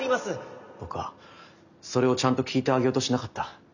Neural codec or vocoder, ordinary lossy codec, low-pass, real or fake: none; none; 7.2 kHz; real